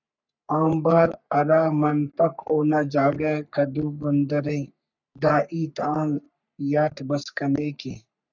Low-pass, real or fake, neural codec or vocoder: 7.2 kHz; fake; codec, 44.1 kHz, 3.4 kbps, Pupu-Codec